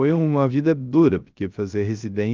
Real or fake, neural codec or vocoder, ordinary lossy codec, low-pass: fake; codec, 16 kHz, 0.3 kbps, FocalCodec; Opus, 24 kbps; 7.2 kHz